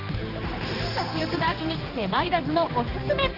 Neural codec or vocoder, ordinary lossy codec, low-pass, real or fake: codec, 16 kHz, 2 kbps, X-Codec, HuBERT features, trained on general audio; Opus, 24 kbps; 5.4 kHz; fake